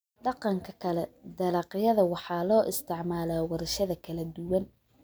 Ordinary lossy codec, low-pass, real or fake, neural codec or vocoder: none; none; real; none